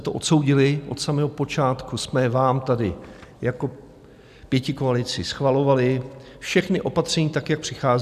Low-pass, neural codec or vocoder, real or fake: 14.4 kHz; none; real